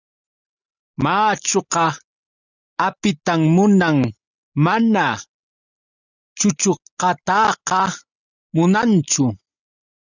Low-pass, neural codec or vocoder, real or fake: 7.2 kHz; none; real